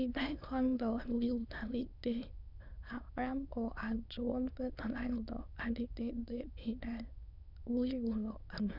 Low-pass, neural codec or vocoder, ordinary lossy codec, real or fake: 5.4 kHz; autoencoder, 22.05 kHz, a latent of 192 numbers a frame, VITS, trained on many speakers; none; fake